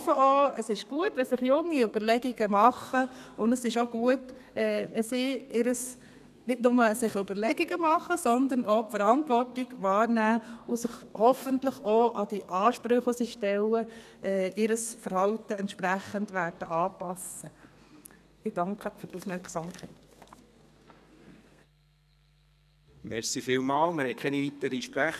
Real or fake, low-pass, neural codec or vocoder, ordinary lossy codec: fake; 14.4 kHz; codec, 32 kHz, 1.9 kbps, SNAC; none